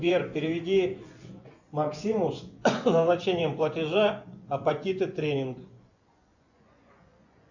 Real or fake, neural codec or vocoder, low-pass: fake; vocoder, 24 kHz, 100 mel bands, Vocos; 7.2 kHz